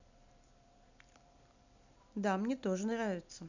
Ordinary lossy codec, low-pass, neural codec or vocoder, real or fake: none; 7.2 kHz; vocoder, 44.1 kHz, 128 mel bands every 256 samples, BigVGAN v2; fake